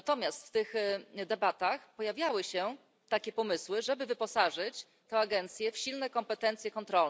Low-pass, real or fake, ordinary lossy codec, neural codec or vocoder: none; real; none; none